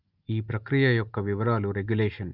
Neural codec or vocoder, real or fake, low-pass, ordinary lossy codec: none; real; 5.4 kHz; Opus, 24 kbps